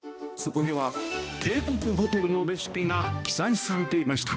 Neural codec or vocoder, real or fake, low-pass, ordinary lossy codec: codec, 16 kHz, 1 kbps, X-Codec, HuBERT features, trained on balanced general audio; fake; none; none